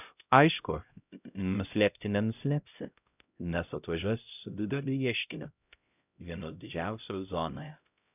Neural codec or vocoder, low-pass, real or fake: codec, 16 kHz, 0.5 kbps, X-Codec, HuBERT features, trained on LibriSpeech; 3.6 kHz; fake